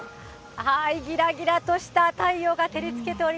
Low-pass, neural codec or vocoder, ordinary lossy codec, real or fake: none; none; none; real